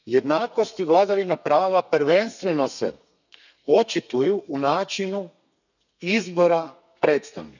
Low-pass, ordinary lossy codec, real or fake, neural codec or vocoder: 7.2 kHz; none; fake; codec, 32 kHz, 1.9 kbps, SNAC